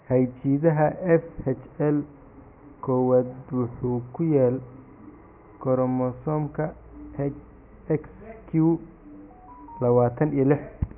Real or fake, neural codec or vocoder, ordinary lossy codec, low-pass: real; none; none; 3.6 kHz